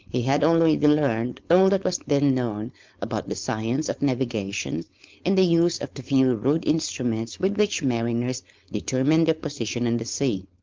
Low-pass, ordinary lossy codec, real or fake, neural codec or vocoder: 7.2 kHz; Opus, 24 kbps; fake; codec, 16 kHz, 4.8 kbps, FACodec